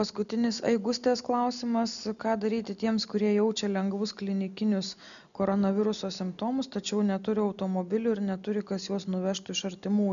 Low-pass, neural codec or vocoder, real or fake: 7.2 kHz; none; real